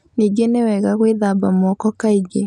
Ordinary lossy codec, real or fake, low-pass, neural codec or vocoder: none; real; none; none